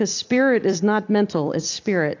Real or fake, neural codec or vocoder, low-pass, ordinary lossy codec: fake; vocoder, 44.1 kHz, 128 mel bands every 256 samples, BigVGAN v2; 7.2 kHz; AAC, 48 kbps